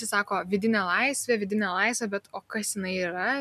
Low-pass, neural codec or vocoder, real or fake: 14.4 kHz; none; real